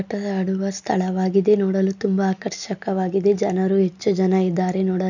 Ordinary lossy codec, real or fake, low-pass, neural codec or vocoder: none; real; 7.2 kHz; none